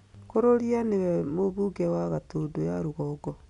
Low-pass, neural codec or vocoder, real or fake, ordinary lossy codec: 10.8 kHz; none; real; none